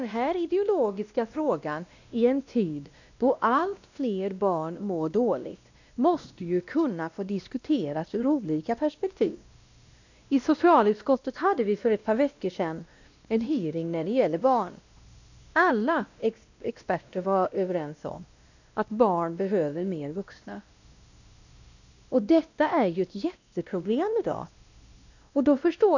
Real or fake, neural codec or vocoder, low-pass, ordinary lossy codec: fake; codec, 16 kHz, 1 kbps, X-Codec, WavLM features, trained on Multilingual LibriSpeech; 7.2 kHz; none